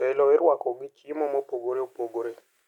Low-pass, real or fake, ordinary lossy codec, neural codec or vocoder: 19.8 kHz; real; none; none